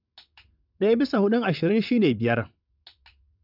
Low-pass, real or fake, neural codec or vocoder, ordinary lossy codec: 5.4 kHz; fake; vocoder, 22.05 kHz, 80 mel bands, WaveNeXt; none